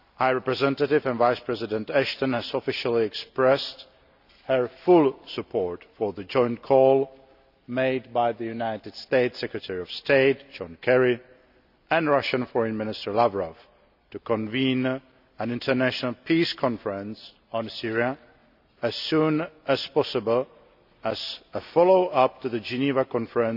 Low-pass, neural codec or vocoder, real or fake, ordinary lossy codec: 5.4 kHz; none; real; none